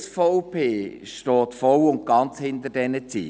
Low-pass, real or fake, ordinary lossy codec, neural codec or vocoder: none; real; none; none